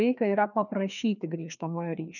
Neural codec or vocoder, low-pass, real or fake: codec, 16 kHz, 2 kbps, FreqCodec, larger model; 7.2 kHz; fake